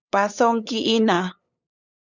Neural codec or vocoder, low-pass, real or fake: codec, 16 kHz, 8 kbps, FunCodec, trained on LibriTTS, 25 frames a second; 7.2 kHz; fake